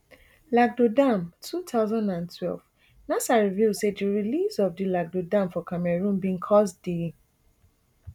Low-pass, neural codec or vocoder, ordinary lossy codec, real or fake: 19.8 kHz; none; none; real